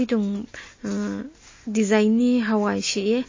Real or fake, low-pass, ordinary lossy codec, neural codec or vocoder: real; 7.2 kHz; MP3, 32 kbps; none